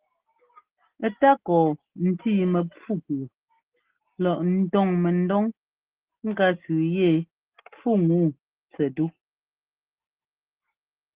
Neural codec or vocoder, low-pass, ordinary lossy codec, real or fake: none; 3.6 kHz; Opus, 16 kbps; real